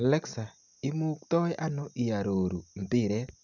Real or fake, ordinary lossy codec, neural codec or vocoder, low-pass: real; none; none; 7.2 kHz